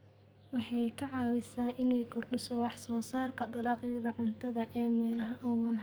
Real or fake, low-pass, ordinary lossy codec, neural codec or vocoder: fake; none; none; codec, 44.1 kHz, 2.6 kbps, SNAC